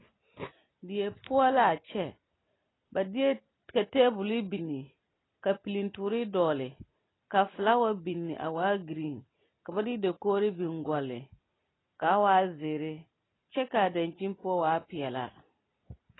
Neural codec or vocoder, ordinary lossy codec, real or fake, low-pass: none; AAC, 16 kbps; real; 7.2 kHz